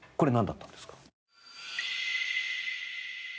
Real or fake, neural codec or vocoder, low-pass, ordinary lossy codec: real; none; none; none